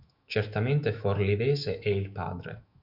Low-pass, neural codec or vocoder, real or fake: 5.4 kHz; autoencoder, 48 kHz, 128 numbers a frame, DAC-VAE, trained on Japanese speech; fake